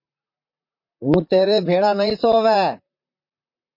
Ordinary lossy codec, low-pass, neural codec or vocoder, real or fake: MP3, 32 kbps; 5.4 kHz; vocoder, 44.1 kHz, 128 mel bands, Pupu-Vocoder; fake